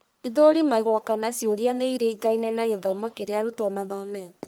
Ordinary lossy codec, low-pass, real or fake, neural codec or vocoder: none; none; fake; codec, 44.1 kHz, 1.7 kbps, Pupu-Codec